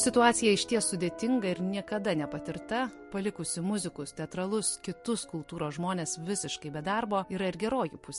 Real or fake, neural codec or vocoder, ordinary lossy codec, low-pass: real; none; MP3, 48 kbps; 14.4 kHz